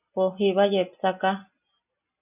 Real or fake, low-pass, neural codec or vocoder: real; 3.6 kHz; none